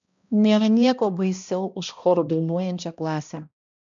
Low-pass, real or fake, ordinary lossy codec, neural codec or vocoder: 7.2 kHz; fake; MP3, 64 kbps; codec, 16 kHz, 1 kbps, X-Codec, HuBERT features, trained on balanced general audio